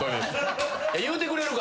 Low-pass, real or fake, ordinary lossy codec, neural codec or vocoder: none; real; none; none